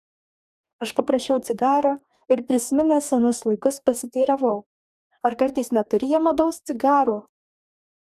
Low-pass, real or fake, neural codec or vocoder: 14.4 kHz; fake; codec, 44.1 kHz, 2.6 kbps, DAC